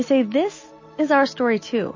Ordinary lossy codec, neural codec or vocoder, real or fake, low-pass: MP3, 32 kbps; none; real; 7.2 kHz